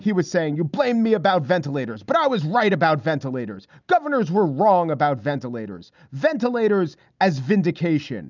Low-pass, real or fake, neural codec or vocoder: 7.2 kHz; real; none